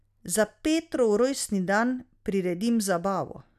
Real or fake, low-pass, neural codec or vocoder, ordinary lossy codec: real; 14.4 kHz; none; none